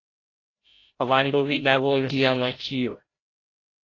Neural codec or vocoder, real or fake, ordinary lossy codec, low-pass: codec, 16 kHz, 0.5 kbps, FreqCodec, larger model; fake; AAC, 32 kbps; 7.2 kHz